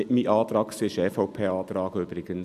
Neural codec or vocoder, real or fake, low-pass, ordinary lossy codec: none; real; 14.4 kHz; none